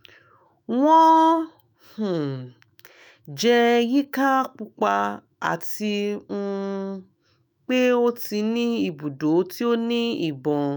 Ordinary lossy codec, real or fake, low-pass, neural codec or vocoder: none; fake; none; autoencoder, 48 kHz, 128 numbers a frame, DAC-VAE, trained on Japanese speech